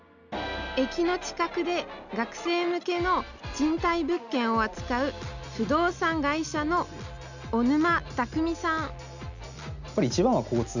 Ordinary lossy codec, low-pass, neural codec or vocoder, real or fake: none; 7.2 kHz; none; real